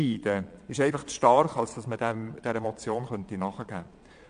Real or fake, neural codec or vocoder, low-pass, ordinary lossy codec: fake; vocoder, 22.05 kHz, 80 mel bands, Vocos; 9.9 kHz; AAC, 64 kbps